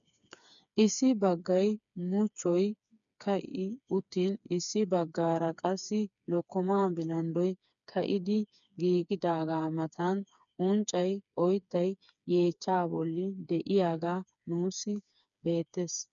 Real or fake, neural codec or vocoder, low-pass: fake; codec, 16 kHz, 4 kbps, FreqCodec, smaller model; 7.2 kHz